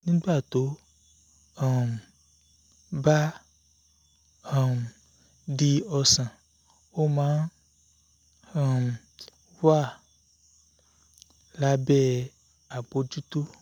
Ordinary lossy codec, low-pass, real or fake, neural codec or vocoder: none; 19.8 kHz; real; none